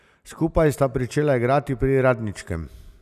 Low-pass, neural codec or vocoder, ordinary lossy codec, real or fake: 14.4 kHz; none; none; real